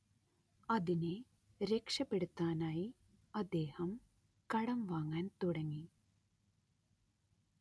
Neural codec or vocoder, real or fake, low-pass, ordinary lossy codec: none; real; none; none